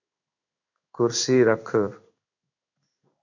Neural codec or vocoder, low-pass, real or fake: codec, 16 kHz in and 24 kHz out, 1 kbps, XY-Tokenizer; 7.2 kHz; fake